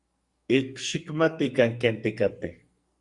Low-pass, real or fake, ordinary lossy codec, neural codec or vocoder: 10.8 kHz; fake; Opus, 64 kbps; codec, 44.1 kHz, 2.6 kbps, SNAC